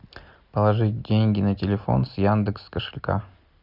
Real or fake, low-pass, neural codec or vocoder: real; 5.4 kHz; none